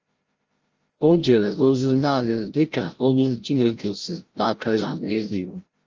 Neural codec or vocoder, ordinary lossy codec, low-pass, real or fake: codec, 16 kHz, 0.5 kbps, FreqCodec, larger model; Opus, 24 kbps; 7.2 kHz; fake